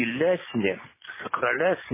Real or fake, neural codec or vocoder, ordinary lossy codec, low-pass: real; none; MP3, 16 kbps; 3.6 kHz